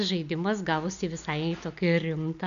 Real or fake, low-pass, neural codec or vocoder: real; 7.2 kHz; none